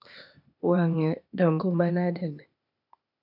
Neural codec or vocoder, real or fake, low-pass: codec, 16 kHz, 0.8 kbps, ZipCodec; fake; 5.4 kHz